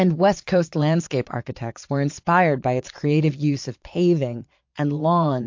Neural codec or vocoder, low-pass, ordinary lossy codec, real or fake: vocoder, 22.05 kHz, 80 mel bands, Vocos; 7.2 kHz; MP3, 48 kbps; fake